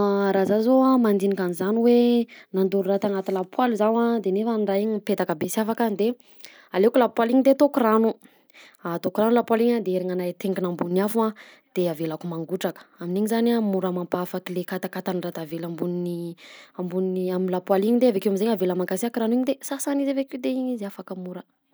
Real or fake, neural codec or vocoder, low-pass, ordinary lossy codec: real; none; none; none